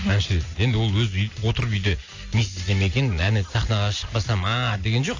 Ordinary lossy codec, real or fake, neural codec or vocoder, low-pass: AAC, 48 kbps; real; none; 7.2 kHz